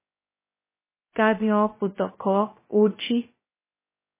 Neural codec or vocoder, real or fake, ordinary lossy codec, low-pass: codec, 16 kHz, 0.2 kbps, FocalCodec; fake; MP3, 16 kbps; 3.6 kHz